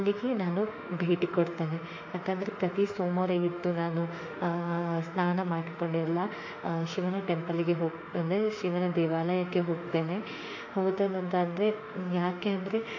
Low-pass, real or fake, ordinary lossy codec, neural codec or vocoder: 7.2 kHz; fake; AAC, 48 kbps; autoencoder, 48 kHz, 32 numbers a frame, DAC-VAE, trained on Japanese speech